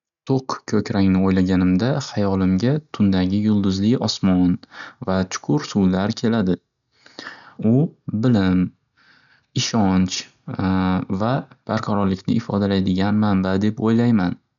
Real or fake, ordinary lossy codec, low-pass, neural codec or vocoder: real; none; 7.2 kHz; none